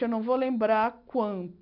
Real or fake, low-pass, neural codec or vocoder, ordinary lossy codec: real; 5.4 kHz; none; none